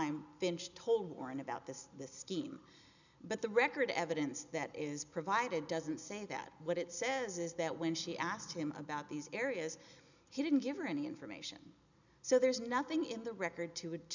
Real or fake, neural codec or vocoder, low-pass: real; none; 7.2 kHz